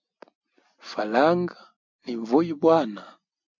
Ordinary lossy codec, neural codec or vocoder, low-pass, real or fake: MP3, 48 kbps; none; 7.2 kHz; real